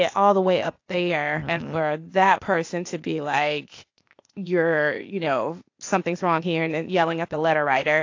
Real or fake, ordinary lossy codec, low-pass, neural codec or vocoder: fake; AAC, 48 kbps; 7.2 kHz; codec, 16 kHz, 0.8 kbps, ZipCodec